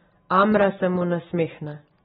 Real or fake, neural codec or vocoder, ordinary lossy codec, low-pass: real; none; AAC, 16 kbps; 10.8 kHz